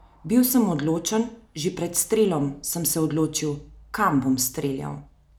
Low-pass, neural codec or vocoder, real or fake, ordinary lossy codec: none; none; real; none